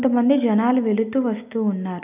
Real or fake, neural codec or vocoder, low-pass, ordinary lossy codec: real; none; 3.6 kHz; none